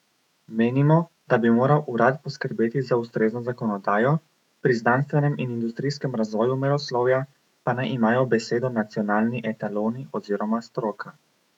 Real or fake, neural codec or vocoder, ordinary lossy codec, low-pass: fake; autoencoder, 48 kHz, 128 numbers a frame, DAC-VAE, trained on Japanese speech; none; 19.8 kHz